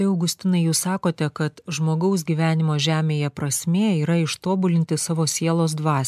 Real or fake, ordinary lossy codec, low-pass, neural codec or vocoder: real; MP3, 96 kbps; 14.4 kHz; none